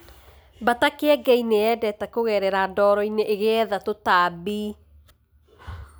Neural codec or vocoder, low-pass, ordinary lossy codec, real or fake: none; none; none; real